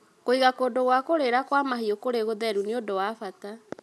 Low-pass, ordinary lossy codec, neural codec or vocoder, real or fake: none; none; none; real